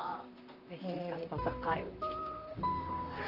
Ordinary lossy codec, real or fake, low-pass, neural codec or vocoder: Opus, 24 kbps; fake; 5.4 kHz; vocoder, 44.1 kHz, 128 mel bands, Pupu-Vocoder